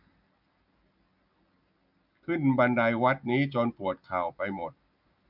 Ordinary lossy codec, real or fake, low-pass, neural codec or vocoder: none; real; 5.4 kHz; none